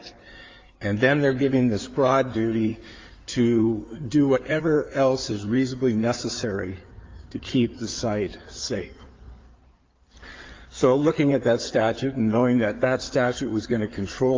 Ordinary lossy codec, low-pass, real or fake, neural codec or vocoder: Opus, 32 kbps; 7.2 kHz; fake; codec, 16 kHz in and 24 kHz out, 2.2 kbps, FireRedTTS-2 codec